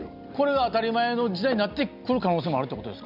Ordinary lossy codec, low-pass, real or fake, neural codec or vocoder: none; 5.4 kHz; real; none